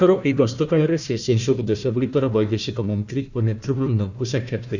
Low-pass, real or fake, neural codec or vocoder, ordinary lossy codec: 7.2 kHz; fake; codec, 16 kHz, 1 kbps, FunCodec, trained on Chinese and English, 50 frames a second; none